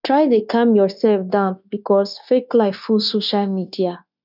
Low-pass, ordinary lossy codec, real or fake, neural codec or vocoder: 5.4 kHz; none; fake; codec, 16 kHz, 0.9 kbps, LongCat-Audio-Codec